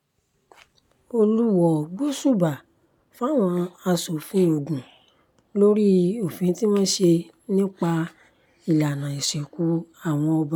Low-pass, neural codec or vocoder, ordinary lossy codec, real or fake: 19.8 kHz; none; none; real